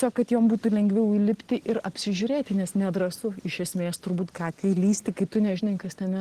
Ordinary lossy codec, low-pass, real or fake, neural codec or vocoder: Opus, 16 kbps; 14.4 kHz; real; none